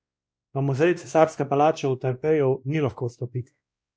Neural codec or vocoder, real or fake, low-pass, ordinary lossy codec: codec, 16 kHz, 1 kbps, X-Codec, WavLM features, trained on Multilingual LibriSpeech; fake; none; none